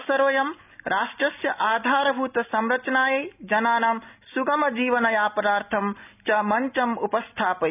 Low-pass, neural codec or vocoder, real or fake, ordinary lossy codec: 3.6 kHz; none; real; none